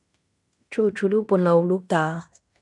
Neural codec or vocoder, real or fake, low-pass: codec, 16 kHz in and 24 kHz out, 0.9 kbps, LongCat-Audio-Codec, fine tuned four codebook decoder; fake; 10.8 kHz